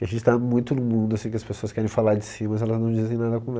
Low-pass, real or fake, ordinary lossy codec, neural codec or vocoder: none; real; none; none